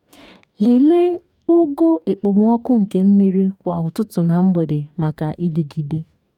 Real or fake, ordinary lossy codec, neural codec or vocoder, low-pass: fake; none; codec, 44.1 kHz, 2.6 kbps, DAC; 19.8 kHz